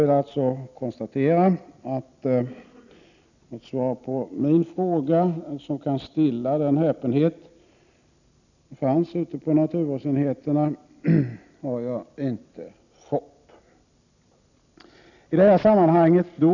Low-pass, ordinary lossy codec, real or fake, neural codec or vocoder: 7.2 kHz; none; real; none